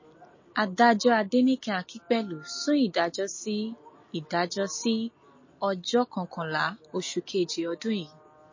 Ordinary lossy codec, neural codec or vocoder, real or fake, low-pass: MP3, 32 kbps; none; real; 7.2 kHz